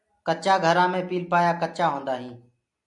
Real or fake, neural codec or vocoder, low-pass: real; none; 10.8 kHz